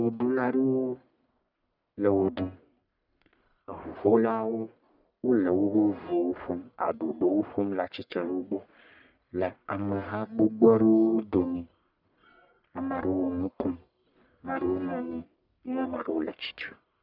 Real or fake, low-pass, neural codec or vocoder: fake; 5.4 kHz; codec, 44.1 kHz, 1.7 kbps, Pupu-Codec